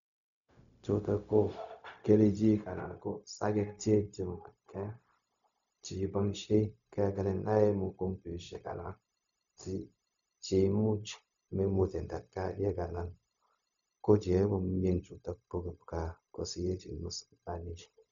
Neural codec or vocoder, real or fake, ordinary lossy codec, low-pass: codec, 16 kHz, 0.4 kbps, LongCat-Audio-Codec; fake; none; 7.2 kHz